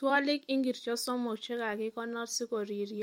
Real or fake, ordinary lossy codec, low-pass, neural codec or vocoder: fake; MP3, 64 kbps; 19.8 kHz; vocoder, 44.1 kHz, 128 mel bands every 256 samples, BigVGAN v2